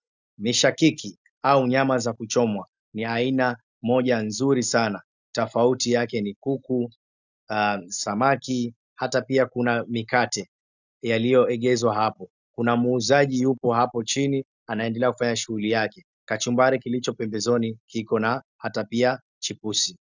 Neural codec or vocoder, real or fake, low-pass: none; real; 7.2 kHz